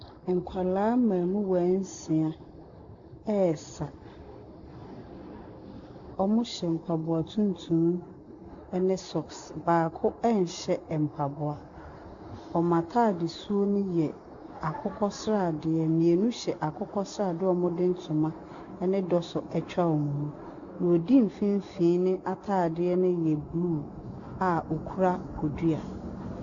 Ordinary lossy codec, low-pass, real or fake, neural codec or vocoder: Opus, 64 kbps; 7.2 kHz; real; none